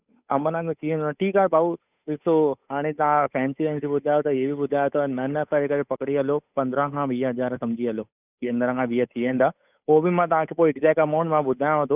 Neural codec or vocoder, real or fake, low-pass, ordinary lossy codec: codec, 16 kHz, 8 kbps, FunCodec, trained on Chinese and English, 25 frames a second; fake; 3.6 kHz; none